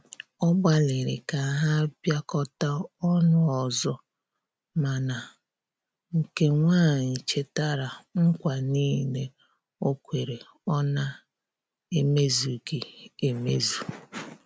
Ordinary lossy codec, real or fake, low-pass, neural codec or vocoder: none; real; none; none